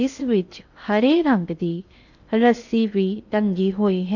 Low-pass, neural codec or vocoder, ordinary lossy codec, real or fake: 7.2 kHz; codec, 16 kHz in and 24 kHz out, 0.8 kbps, FocalCodec, streaming, 65536 codes; none; fake